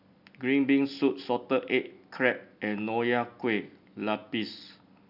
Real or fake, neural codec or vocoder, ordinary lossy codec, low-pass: real; none; none; 5.4 kHz